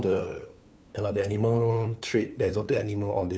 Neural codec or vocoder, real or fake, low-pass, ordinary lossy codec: codec, 16 kHz, 2 kbps, FunCodec, trained on LibriTTS, 25 frames a second; fake; none; none